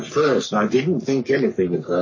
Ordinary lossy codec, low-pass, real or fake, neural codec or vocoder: MP3, 32 kbps; 7.2 kHz; fake; codec, 44.1 kHz, 3.4 kbps, Pupu-Codec